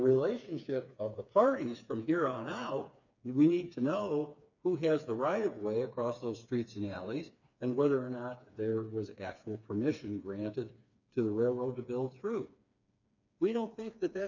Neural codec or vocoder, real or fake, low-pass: codec, 16 kHz, 4 kbps, FreqCodec, smaller model; fake; 7.2 kHz